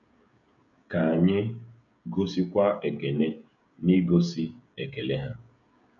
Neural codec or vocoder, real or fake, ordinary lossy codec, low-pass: codec, 16 kHz, 16 kbps, FreqCodec, smaller model; fake; none; 7.2 kHz